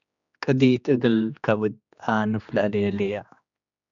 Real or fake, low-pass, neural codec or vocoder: fake; 7.2 kHz; codec, 16 kHz, 2 kbps, X-Codec, HuBERT features, trained on general audio